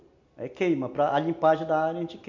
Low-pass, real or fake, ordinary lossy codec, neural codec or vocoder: 7.2 kHz; real; MP3, 48 kbps; none